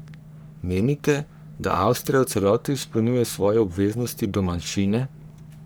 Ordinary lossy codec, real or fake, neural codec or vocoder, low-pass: none; fake; codec, 44.1 kHz, 3.4 kbps, Pupu-Codec; none